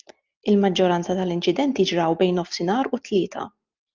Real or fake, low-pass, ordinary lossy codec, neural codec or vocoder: real; 7.2 kHz; Opus, 32 kbps; none